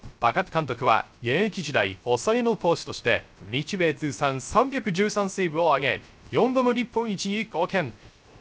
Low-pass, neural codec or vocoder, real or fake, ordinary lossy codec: none; codec, 16 kHz, 0.3 kbps, FocalCodec; fake; none